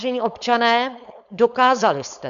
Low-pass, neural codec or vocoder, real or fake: 7.2 kHz; codec, 16 kHz, 4.8 kbps, FACodec; fake